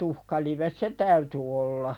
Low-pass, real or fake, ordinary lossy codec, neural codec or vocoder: 19.8 kHz; real; none; none